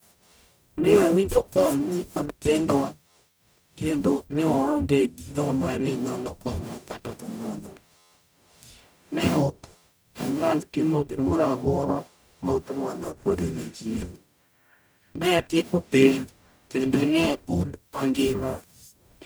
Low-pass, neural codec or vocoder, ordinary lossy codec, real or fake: none; codec, 44.1 kHz, 0.9 kbps, DAC; none; fake